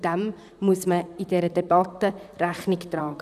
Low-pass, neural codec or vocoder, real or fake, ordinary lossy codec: 14.4 kHz; vocoder, 44.1 kHz, 128 mel bands, Pupu-Vocoder; fake; none